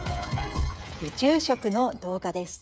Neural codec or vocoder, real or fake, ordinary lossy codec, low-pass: codec, 16 kHz, 8 kbps, FreqCodec, smaller model; fake; none; none